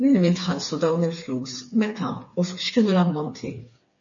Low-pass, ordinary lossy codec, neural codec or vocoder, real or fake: 7.2 kHz; MP3, 32 kbps; codec, 16 kHz, 4 kbps, FreqCodec, smaller model; fake